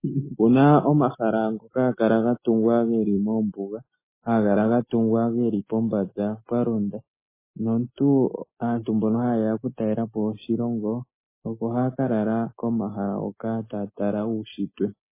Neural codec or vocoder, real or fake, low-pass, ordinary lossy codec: none; real; 3.6 kHz; MP3, 16 kbps